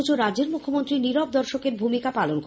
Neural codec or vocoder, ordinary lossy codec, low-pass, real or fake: none; none; none; real